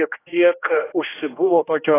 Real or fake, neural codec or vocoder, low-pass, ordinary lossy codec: fake; codec, 16 kHz, 1 kbps, X-Codec, HuBERT features, trained on general audio; 3.6 kHz; AAC, 16 kbps